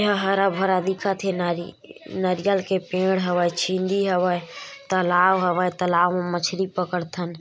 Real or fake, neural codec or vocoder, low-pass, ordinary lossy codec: real; none; none; none